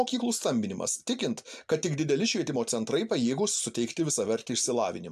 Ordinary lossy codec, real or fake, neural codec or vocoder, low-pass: AAC, 96 kbps; real; none; 14.4 kHz